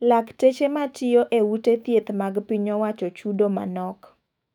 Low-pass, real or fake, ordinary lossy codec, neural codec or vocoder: 19.8 kHz; fake; none; autoencoder, 48 kHz, 128 numbers a frame, DAC-VAE, trained on Japanese speech